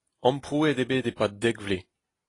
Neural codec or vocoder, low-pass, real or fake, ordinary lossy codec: none; 10.8 kHz; real; AAC, 32 kbps